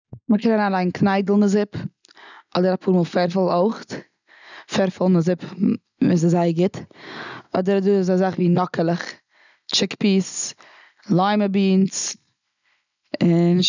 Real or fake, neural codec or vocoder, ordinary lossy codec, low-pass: real; none; none; 7.2 kHz